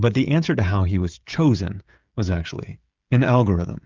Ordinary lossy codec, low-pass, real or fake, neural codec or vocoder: Opus, 32 kbps; 7.2 kHz; fake; codec, 16 kHz, 16 kbps, FreqCodec, smaller model